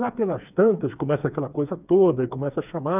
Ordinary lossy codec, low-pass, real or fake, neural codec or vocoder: none; 3.6 kHz; fake; codec, 16 kHz, 4 kbps, FreqCodec, smaller model